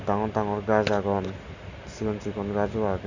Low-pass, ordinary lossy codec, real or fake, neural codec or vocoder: 7.2 kHz; Opus, 64 kbps; real; none